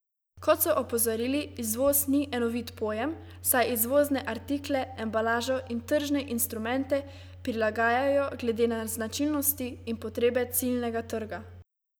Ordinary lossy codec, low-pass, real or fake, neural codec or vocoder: none; none; real; none